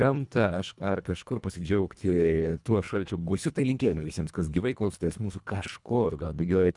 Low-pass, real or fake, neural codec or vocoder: 10.8 kHz; fake; codec, 24 kHz, 1.5 kbps, HILCodec